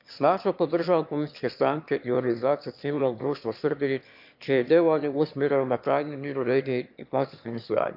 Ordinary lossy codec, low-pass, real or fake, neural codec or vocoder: AAC, 48 kbps; 5.4 kHz; fake; autoencoder, 22.05 kHz, a latent of 192 numbers a frame, VITS, trained on one speaker